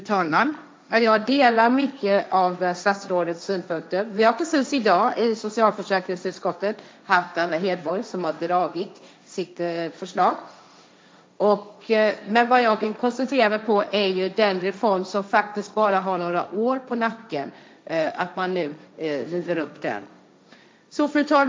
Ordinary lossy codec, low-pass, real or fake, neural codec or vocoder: none; none; fake; codec, 16 kHz, 1.1 kbps, Voila-Tokenizer